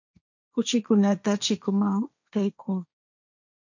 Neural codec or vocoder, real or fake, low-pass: codec, 16 kHz, 1.1 kbps, Voila-Tokenizer; fake; 7.2 kHz